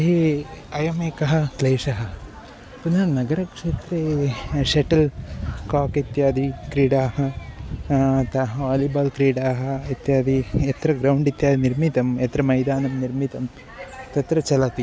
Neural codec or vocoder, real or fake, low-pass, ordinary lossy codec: none; real; none; none